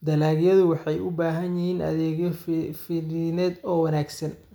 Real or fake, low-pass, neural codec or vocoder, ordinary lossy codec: real; none; none; none